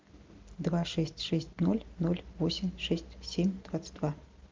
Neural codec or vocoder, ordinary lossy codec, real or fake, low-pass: none; Opus, 24 kbps; real; 7.2 kHz